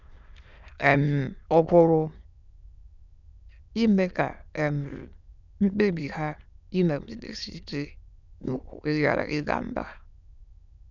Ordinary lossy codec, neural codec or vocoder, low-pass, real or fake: none; autoencoder, 22.05 kHz, a latent of 192 numbers a frame, VITS, trained on many speakers; 7.2 kHz; fake